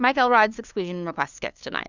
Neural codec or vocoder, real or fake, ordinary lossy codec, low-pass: codec, 16 kHz, 2 kbps, FunCodec, trained on LibriTTS, 25 frames a second; fake; Opus, 64 kbps; 7.2 kHz